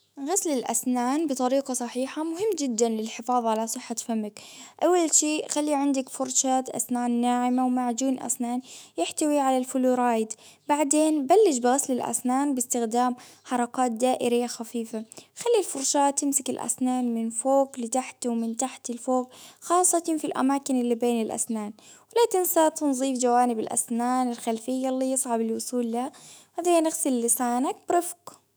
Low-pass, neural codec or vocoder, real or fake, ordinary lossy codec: none; autoencoder, 48 kHz, 128 numbers a frame, DAC-VAE, trained on Japanese speech; fake; none